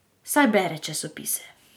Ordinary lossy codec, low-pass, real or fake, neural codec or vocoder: none; none; real; none